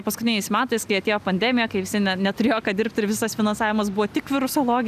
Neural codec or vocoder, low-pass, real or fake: none; 14.4 kHz; real